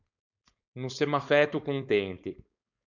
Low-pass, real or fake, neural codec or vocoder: 7.2 kHz; fake; codec, 16 kHz, 4.8 kbps, FACodec